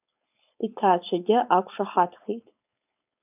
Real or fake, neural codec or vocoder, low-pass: fake; codec, 16 kHz, 4.8 kbps, FACodec; 3.6 kHz